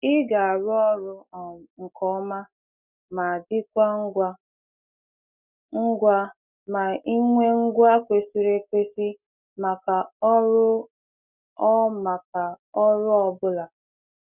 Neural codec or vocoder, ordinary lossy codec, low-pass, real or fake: none; none; 3.6 kHz; real